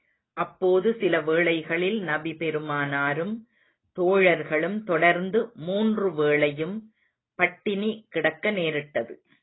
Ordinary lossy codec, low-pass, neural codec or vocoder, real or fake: AAC, 16 kbps; 7.2 kHz; none; real